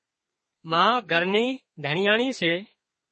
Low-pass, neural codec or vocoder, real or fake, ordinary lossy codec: 10.8 kHz; codec, 32 kHz, 1.9 kbps, SNAC; fake; MP3, 32 kbps